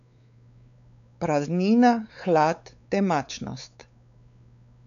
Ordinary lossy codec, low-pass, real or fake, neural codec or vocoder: none; 7.2 kHz; fake; codec, 16 kHz, 4 kbps, X-Codec, WavLM features, trained on Multilingual LibriSpeech